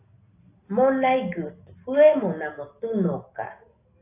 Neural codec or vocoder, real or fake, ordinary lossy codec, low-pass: none; real; AAC, 24 kbps; 3.6 kHz